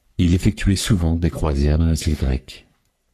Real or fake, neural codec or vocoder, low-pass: fake; codec, 44.1 kHz, 3.4 kbps, Pupu-Codec; 14.4 kHz